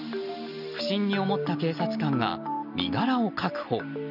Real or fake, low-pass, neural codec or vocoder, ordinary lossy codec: real; 5.4 kHz; none; none